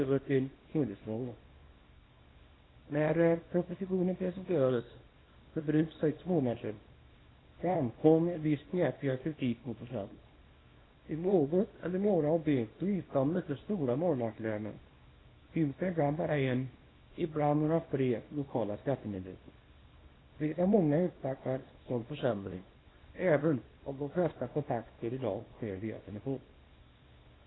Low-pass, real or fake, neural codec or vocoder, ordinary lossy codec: 7.2 kHz; fake; codec, 24 kHz, 0.9 kbps, WavTokenizer, small release; AAC, 16 kbps